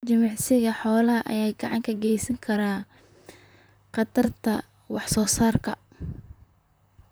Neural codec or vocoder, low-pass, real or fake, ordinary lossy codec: vocoder, 44.1 kHz, 128 mel bands, Pupu-Vocoder; none; fake; none